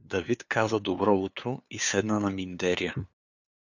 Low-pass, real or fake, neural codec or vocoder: 7.2 kHz; fake; codec, 16 kHz, 2 kbps, FunCodec, trained on LibriTTS, 25 frames a second